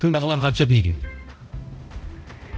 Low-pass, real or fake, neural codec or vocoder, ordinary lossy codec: none; fake; codec, 16 kHz, 0.5 kbps, X-Codec, HuBERT features, trained on balanced general audio; none